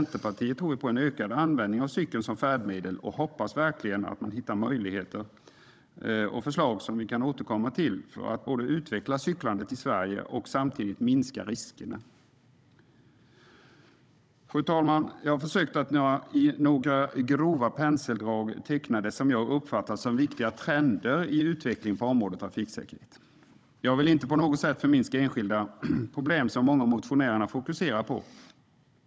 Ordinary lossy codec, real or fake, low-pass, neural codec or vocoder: none; fake; none; codec, 16 kHz, 16 kbps, FunCodec, trained on Chinese and English, 50 frames a second